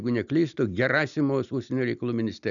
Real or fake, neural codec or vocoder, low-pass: real; none; 7.2 kHz